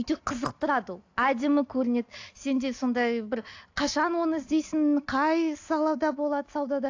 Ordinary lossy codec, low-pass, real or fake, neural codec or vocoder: AAC, 48 kbps; 7.2 kHz; real; none